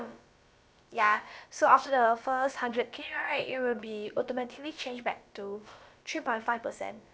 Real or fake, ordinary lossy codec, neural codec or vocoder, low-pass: fake; none; codec, 16 kHz, about 1 kbps, DyCAST, with the encoder's durations; none